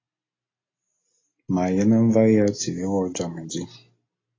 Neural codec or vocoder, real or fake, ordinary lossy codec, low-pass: none; real; AAC, 32 kbps; 7.2 kHz